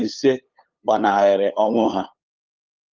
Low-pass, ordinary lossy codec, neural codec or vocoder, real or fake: 7.2 kHz; Opus, 24 kbps; codec, 16 kHz, 8 kbps, FunCodec, trained on LibriTTS, 25 frames a second; fake